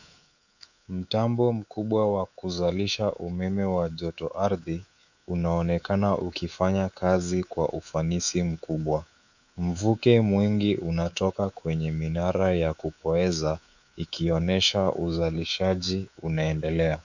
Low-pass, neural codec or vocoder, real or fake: 7.2 kHz; codec, 24 kHz, 3.1 kbps, DualCodec; fake